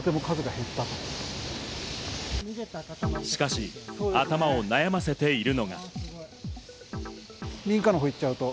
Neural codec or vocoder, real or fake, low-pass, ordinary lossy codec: none; real; none; none